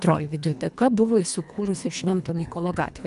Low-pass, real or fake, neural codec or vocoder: 10.8 kHz; fake; codec, 24 kHz, 1.5 kbps, HILCodec